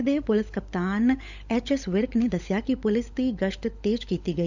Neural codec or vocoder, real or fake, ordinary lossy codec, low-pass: codec, 16 kHz, 16 kbps, FunCodec, trained on LibriTTS, 50 frames a second; fake; none; 7.2 kHz